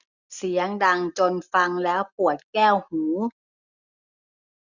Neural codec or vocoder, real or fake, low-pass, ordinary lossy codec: none; real; 7.2 kHz; none